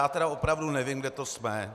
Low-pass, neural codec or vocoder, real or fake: 14.4 kHz; none; real